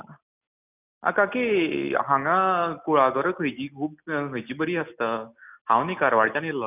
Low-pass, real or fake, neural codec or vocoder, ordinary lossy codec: 3.6 kHz; real; none; none